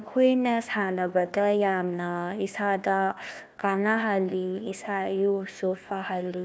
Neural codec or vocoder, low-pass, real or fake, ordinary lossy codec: codec, 16 kHz, 1 kbps, FunCodec, trained on Chinese and English, 50 frames a second; none; fake; none